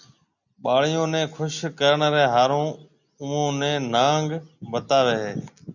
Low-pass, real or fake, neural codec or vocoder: 7.2 kHz; real; none